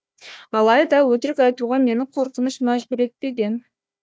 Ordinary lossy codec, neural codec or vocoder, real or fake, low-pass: none; codec, 16 kHz, 1 kbps, FunCodec, trained on Chinese and English, 50 frames a second; fake; none